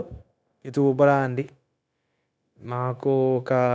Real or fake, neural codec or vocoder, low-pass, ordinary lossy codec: fake; codec, 16 kHz, 0.9 kbps, LongCat-Audio-Codec; none; none